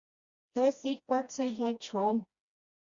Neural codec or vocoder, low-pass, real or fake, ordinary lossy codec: codec, 16 kHz, 1 kbps, FreqCodec, smaller model; 7.2 kHz; fake; Opus, 64 kbps